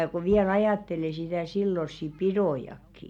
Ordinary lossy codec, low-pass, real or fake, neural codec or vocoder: none; 19.8 kHz; real; none